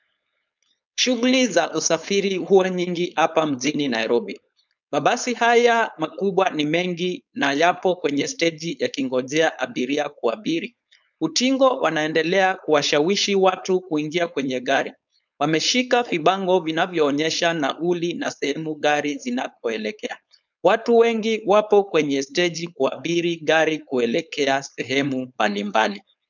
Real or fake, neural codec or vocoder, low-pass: fake; codec, 16 kHz, 4.8 kbps, FACodec; 7.2 kHz